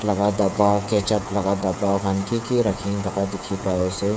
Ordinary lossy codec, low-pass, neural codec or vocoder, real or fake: none; none; codec, 16 kHz, 8 kbps, FreqCodec, smaller model; fake